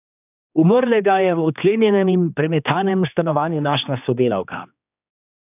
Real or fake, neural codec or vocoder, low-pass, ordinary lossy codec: fake; codec, 16 kHz, 2 kbps, X-Codec, HuBERT features, trained on general audio; 3.6 kHz; none